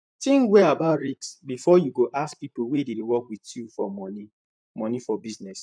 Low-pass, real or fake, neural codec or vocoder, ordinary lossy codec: 9.9 kHz; fake; vocoder, 44.1 kHz, 128 mel bands, Pupu-Vocoder; none